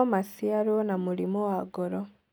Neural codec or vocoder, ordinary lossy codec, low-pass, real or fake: none; none; none; real